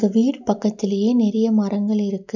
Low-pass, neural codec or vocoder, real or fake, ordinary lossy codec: 7.2 kHz; none; real; none